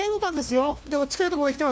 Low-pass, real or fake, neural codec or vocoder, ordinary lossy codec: none; fake; codec, 16 kHz, 1 kbps, FunCodec, trained on LibriTTS, 50 frames a second; none